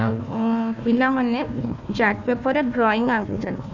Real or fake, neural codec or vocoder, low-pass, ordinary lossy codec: fake; codec, 16 kHz, 1 kbps, FunCodec, trained on Chinese and English, 50 frames a second; 7.2 kHz; none